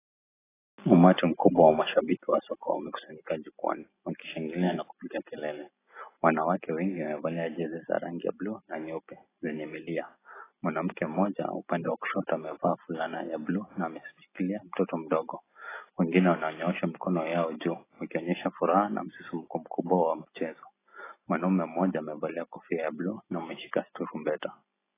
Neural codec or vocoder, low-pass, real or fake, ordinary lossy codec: none; 3.6 kHz; real; AAC, 16 kbps